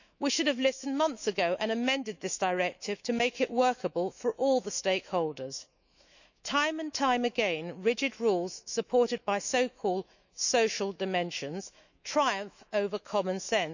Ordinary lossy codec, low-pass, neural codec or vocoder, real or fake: none; 7.2 kHz; autoencoder, 48 kHz, 128 numbers a frame, DAC-VAE, trained on Japanese speech; fake